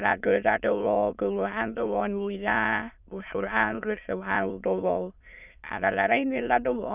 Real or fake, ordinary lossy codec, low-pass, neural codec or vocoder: fake; none; 3.6 kHz; autoencoder, 22.05 kHz, a latent of 192 numbers a frame, VITS, trained on many speakers